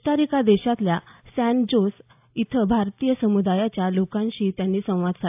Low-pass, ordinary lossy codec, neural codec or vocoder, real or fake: 3.6 kHz; AAC, 32 kbps; none; real